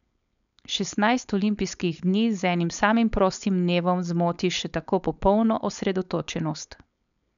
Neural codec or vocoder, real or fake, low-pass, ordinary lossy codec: codec, 16 kHz, 4.8 kbps, FACodec; fake; 7.2 kHz; none